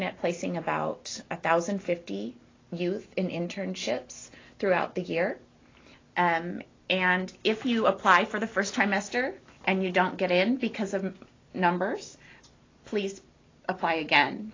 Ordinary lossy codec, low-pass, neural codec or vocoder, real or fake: AAC, 32 kbps; 7.2 kHz; none; real